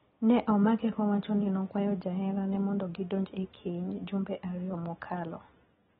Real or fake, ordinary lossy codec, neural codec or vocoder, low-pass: real; AAC, 16 kbps; none; 10.8 kHz